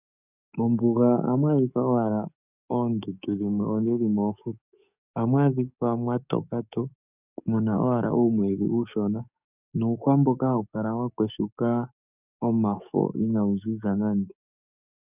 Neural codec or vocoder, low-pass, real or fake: codec, 44.1 kHz, 7.8 kbps, DAC; 3.6 kHz; fake